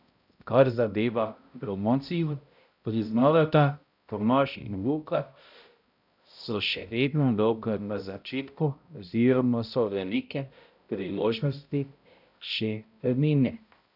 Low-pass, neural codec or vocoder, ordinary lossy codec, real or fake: 5.4 kHz; codec, 16 kHz, 0.5 kbps, X-Codec, HuBERT features, trained on balanced general audio; none; fake